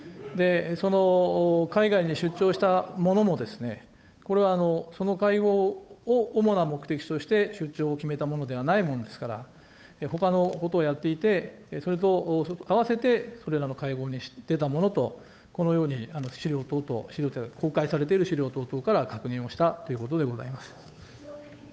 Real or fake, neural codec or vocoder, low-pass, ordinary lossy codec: fake; codec, 16 kHz, 8 kbps, FunCodec, trained on Chinese and English, 25 frames a second; none; none